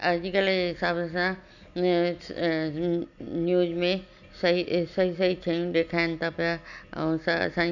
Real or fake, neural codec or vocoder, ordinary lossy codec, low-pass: real; none; none; 7.2 kHz